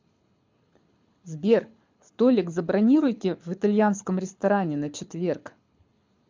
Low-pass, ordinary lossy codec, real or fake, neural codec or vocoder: 7.2 kHz; MP3, 64 kbps; fake; codec, 24 kHz, 6 kbps, HILCodec